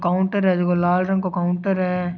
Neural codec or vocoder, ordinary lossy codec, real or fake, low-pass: none; none; real; 7.2 kHz